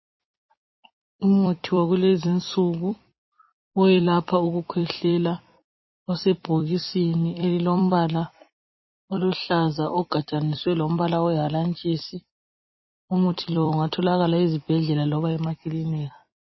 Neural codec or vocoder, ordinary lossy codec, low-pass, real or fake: vocoder, 44.1 kHz, 128 mel bands every 256 samples, BigVGAN v2; MP3, 24 kbps; 7.2 kHz; fake